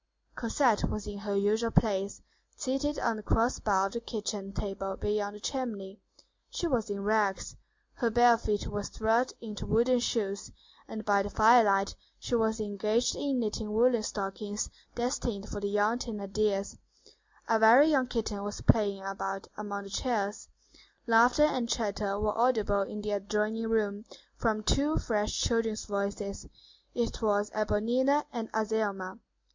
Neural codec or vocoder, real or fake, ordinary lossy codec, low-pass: none; real; MP3, 48 kbps; 7.2 kHz